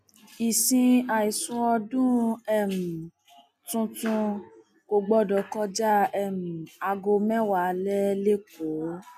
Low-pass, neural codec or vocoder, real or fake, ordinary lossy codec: 14.4 kHz; none; real; none